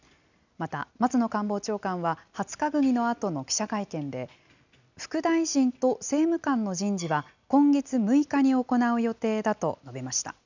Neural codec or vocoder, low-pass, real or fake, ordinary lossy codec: none; 7.2 kHz; real; none